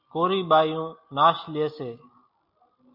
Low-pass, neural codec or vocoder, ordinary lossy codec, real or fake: 5.4 kHz; none; MP3, 48 kbps; real